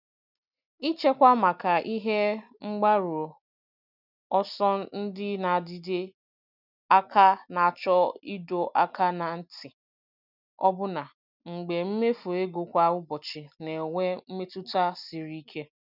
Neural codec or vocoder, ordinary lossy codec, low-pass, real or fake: none; none; 5.4 kHz; real